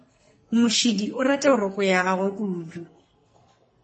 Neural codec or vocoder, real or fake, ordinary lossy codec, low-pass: codec, 44.1 kHz, 2.6 kbps, SNAC; fake; MP3, 32 kbps; 10.8 kHz